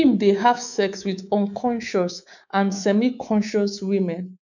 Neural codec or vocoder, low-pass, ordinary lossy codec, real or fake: codec, 24 kHz, 3.1 kbps, DualCodec; 7.2 kHz; none; fake